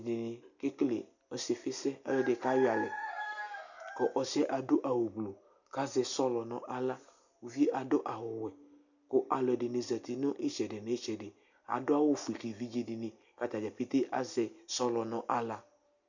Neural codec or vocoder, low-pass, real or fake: none; 7.2 kHz; real